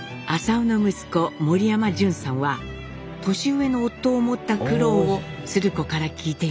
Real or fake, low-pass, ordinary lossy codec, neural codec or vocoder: real; none; none; none